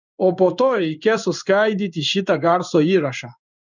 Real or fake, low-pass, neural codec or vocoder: fake; 7.2 kHz; codec, 16 kHz in and 24 kHz out, 1 kbps, XY-Tokenizer